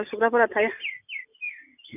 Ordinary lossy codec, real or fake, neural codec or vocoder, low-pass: none; real; none; 3.6 kHz